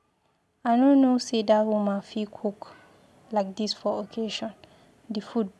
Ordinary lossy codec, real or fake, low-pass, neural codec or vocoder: none; real; none; none